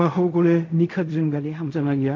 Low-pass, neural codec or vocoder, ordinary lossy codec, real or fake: 7.2 kHz; codec, 16 kHz in and 24 kHz out, 0.4 kbps, LongCat-Audio-Codec, fine tuned four codebook decoder; MP3, 48 kbps; fake